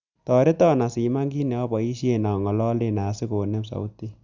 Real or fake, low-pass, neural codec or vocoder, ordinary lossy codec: real; 7.2 kHz; none; Opus, 64 kbps